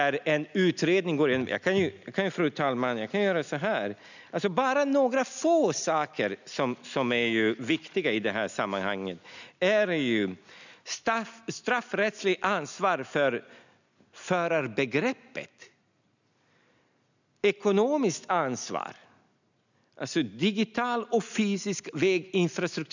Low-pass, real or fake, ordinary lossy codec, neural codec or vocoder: 7.2 kHz; real; none; none